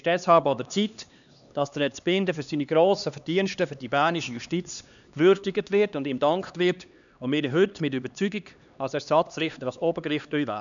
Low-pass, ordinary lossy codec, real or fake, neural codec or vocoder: 7.2 kHz; none; fake; codec, 16 kHz, 2 kbps, X-Codec, HuBERT features, trained on LibriSpeech